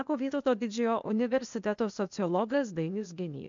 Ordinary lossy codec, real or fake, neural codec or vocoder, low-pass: MP3, 64 kbps; fake; codec, 16 kHz, 0.8 kbps, ZipCodec; 7.2 kHz